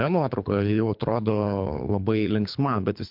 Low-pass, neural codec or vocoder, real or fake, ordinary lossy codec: 5.4 kHz; codec, 24 kHz, 3 kbps, HILCodec; fake; MP3, 48 kbps